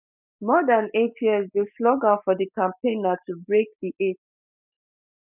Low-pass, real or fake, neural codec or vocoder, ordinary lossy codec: 3.6 kHz; real; none; none